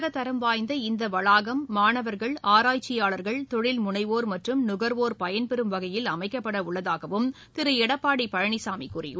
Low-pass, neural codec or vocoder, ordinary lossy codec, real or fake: none; none; none; real